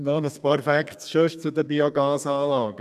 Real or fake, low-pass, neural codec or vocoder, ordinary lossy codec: fake; 14.4 kHz; codec, 32 kHz, 1.9 kbps, SNAC; none